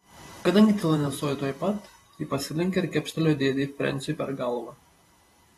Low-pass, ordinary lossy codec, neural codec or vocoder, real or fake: 19.8 kHz; AAC, 32 kbps; vocoder, 44.1 kHz, 128 mel bands every 512 samples, BigVGAN v2; fake